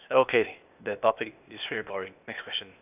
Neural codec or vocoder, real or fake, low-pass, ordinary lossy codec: codec, 16 kHz, 0.8 kbps, ZipCodec; fake; 3.6 kHz; none